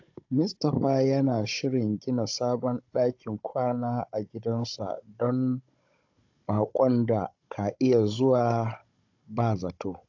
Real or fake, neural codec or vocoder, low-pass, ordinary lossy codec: fake; codec, 16 kHz, 16 kbps, FunCodec, trained on Chinese and English, 50 frames a second; 7.2 kHz; AAC, 48 kbps